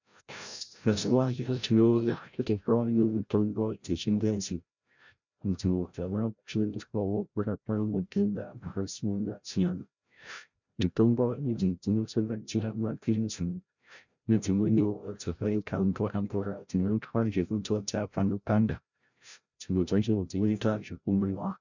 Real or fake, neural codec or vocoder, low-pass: fake; codec, 16 kHz, 0.5 kbps, FreqCodec, larger model; 7.2 kHz